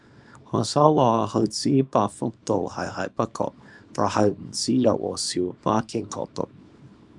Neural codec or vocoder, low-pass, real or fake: codec, 24 kHz, 0.9 kbps, WavTokenizer, small release; 10.8 kHz; fake